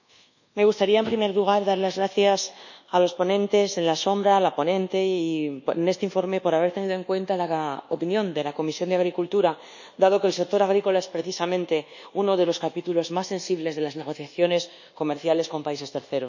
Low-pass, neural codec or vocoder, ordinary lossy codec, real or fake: 7.2 kHz; codec, 24 kHz, 1.2 kbps, DualCodec; none; fake